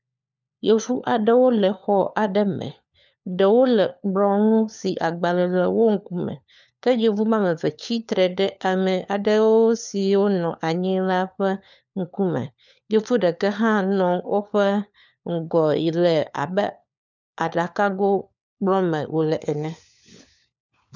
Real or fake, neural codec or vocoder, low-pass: fake; codec, 16 kHz, 4 kbps, FunCodec, trained on LibriTTS, 50 frames a second; 7.2 kHz